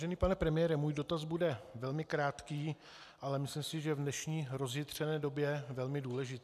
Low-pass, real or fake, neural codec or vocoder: 14.4 kHz; real; none